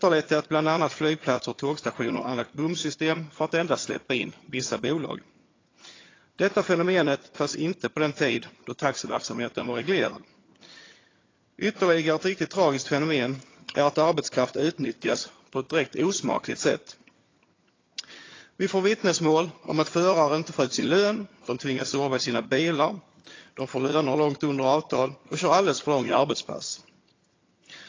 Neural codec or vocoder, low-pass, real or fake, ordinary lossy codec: vocoder, 22.05 kHz, 80 mel bands, HiFi-GAN; 7.2 kHz; fake; AAC, 32 kbps